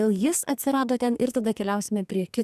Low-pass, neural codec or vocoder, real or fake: 14.4 kHz; codec, 44.1 kHz, 2.6 kbps, SNAC; fake